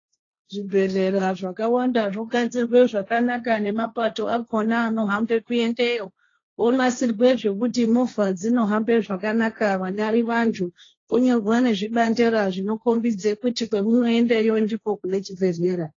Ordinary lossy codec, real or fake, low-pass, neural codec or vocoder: AAC, 32 kbps; fake; 7.2 kHz; codec, 16 kHz, 1.1 kbps, Voila-Tokenizer